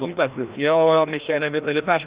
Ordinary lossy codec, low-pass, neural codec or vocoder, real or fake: Opus, 64 kbps; 3.6 kHz; codec, 16 kHz, 1 kbps, FreqCodec, larger model; fake